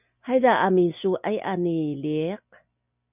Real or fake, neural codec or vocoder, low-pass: real; none; 3.6 kHz